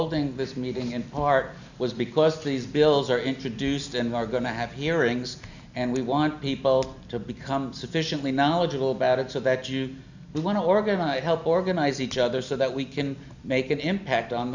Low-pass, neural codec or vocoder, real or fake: 7.2 kHz; none; real